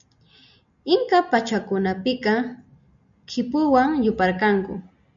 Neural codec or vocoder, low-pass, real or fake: none; 7.2 kHz; real